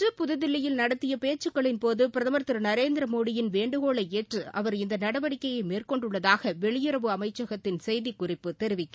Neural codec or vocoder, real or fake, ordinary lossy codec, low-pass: none; real; none; none